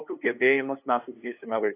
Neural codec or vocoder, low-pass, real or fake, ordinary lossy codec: codec, 16 kHz, 8 kbps, FunCodec, trained on LibriTTS, 25 frames a second; 3.6 kHz; fake; AAC, 24 kbps